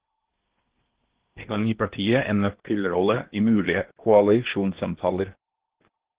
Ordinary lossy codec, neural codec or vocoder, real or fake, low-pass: Opus, 16 kbps; codec, 16 kHz in and 24 kHz out, 0.8 kbps, FocalCodec, streaming, 65536 codes; fake; 3.6 kHz